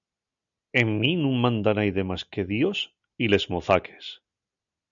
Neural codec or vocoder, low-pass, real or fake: none; 7.2 kHz; real